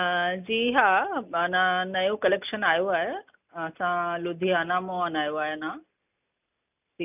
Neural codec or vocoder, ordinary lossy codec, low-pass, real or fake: none; none; 3.6 kHz; real